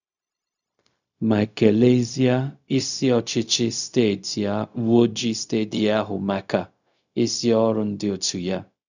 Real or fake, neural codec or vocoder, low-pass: fake; codec, 16 kHz, 0.4 kbps, LongCat-Audio-Codec; 7.2 kHz